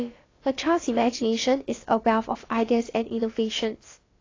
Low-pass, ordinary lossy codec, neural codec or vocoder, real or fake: 7.2 kHz; AAC, 32 kbps; codec, 16 kHz, about 1 kbps, DyCAST, with the encoder's durations; fake